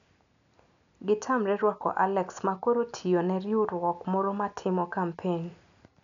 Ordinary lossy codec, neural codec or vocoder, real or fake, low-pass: MP3, 96 kbps; none; real; 7.2 kHz